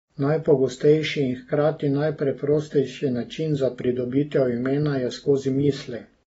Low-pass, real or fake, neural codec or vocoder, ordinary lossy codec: 10.8 kHz; real; none; AAC, 24 kbps